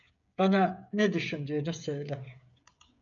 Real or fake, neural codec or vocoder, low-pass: fake; codec, 16 kHz, 16 kbps, FreqCodec, smaller model; 7.2 kHz